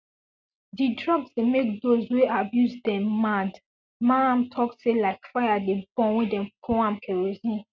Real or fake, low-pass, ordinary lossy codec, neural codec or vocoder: real; none; none; none